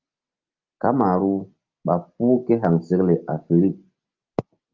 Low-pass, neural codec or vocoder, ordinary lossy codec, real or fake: 7.2 kHz; none; Opus, 24 kbps; real